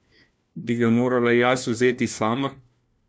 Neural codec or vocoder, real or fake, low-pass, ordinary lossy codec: codec, 16 kHz, 1 kbps, FunCodec, trained on LibriTTS, 50 frames a second; fake; none; none